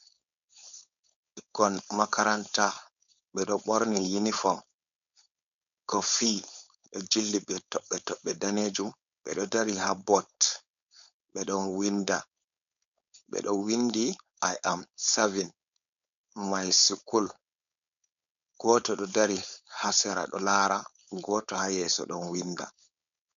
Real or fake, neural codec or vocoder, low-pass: fake; codec, 16 kHz, 4.8 kbps, FACodec; 7.2 kHz